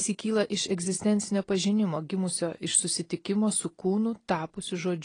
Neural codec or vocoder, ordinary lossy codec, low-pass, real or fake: none; AAC, 32 kbps; 9.9 kHz; real